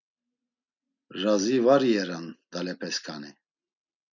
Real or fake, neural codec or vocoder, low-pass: real; none; 7.2 kHz